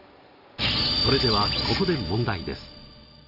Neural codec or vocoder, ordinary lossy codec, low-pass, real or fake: none; none; 5.4 kHz; real